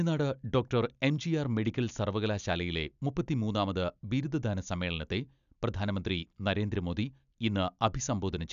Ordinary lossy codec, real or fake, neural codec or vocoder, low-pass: none; real; none; 7.2 kHz